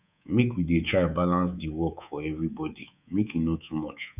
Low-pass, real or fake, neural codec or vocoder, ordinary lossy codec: 3.6 kHz; fake; codec, 24 kHz, 3.1 kbps, DualCodec; none